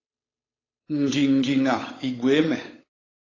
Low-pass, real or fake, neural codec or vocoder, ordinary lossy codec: 7.2 kHz; fake; codec, 16 kHz, 8 kbps, FunCodec, trained on Chinese and English, 25 frames a second; AAC, 32 kbps